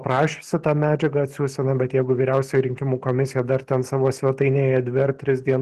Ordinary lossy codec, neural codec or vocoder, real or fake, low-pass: Opus, 16 kbps; none; real; 14.4 kHz